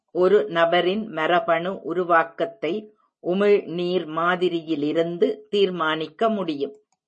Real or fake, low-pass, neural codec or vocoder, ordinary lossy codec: real; 10.8 kHz; none; MP3, 32 kbps